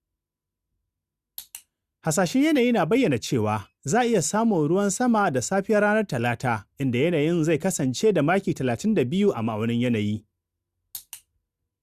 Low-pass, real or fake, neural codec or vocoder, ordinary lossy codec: 14.4 kHz; real; none; Opus, 64 kbps